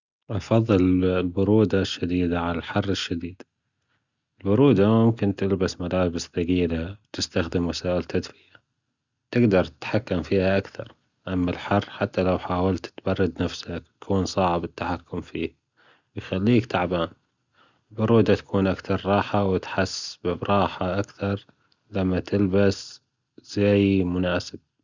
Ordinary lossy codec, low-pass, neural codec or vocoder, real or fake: Opus, 64 kbps; 7.2 kHz; none; real